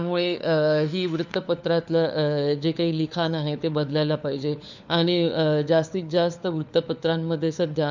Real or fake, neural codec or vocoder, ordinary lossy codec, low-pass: fake; codec, 16 kHz, 4 kbps, FunCodec, trained on LibriTTS, 50 frames a second; none; 7.2 kHz